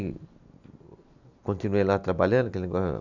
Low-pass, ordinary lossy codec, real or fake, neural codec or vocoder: 7.2 kHz; none; real; none